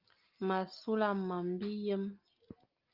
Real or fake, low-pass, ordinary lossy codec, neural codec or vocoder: real; 5.4 kHz; Opus, 24 kbps; none